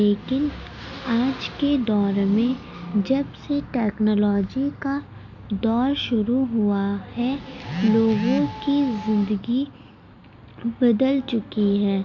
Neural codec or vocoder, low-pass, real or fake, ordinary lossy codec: none; 7.2 kHz; real; none